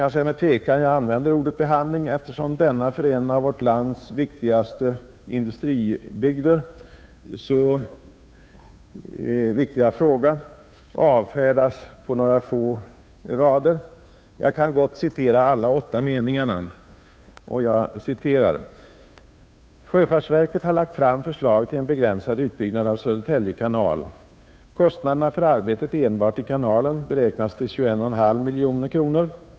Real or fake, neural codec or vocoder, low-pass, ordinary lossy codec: fake; codec, 16 kHz, 2 kbps, FunCodec, trained on Chinese and English, 25 frames a second; none; none